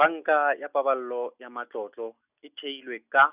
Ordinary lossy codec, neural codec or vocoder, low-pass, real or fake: none; none; 3.6 kHz; real